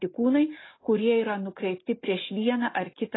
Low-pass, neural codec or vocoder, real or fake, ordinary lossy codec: 7.2 kHz; none; real; AAC, 16 kbps